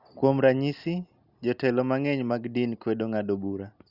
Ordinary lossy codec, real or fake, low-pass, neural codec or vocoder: Opus, 64 kbps; real; 5.4 kHz; none